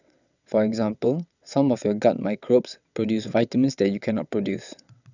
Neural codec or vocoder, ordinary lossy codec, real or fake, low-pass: vocoder, 22.05 kHz, 80 mel bands, WaveNeXt; none; fake; 7.2 kHz